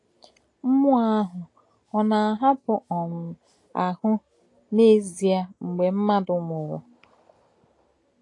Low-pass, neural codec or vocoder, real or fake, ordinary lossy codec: 10.8 kHz; none; real; AAC, 48 kbps